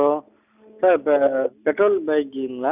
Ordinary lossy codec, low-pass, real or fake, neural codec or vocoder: none; 3.6 kHz; real; none